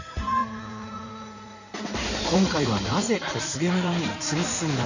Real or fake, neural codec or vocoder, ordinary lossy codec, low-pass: fake; codec, 16 kHz in and 24 kHz out, 2.2 kbps, FireRedTTS-2 codec; none; 7.2 kHz